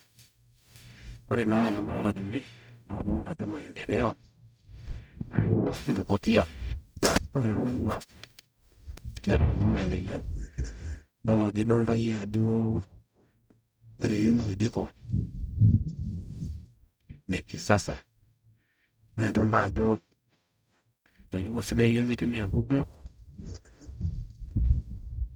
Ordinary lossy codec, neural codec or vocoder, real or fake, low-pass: none; codec, 44.1 kHz, 0.9 kbps, DAC; fake; none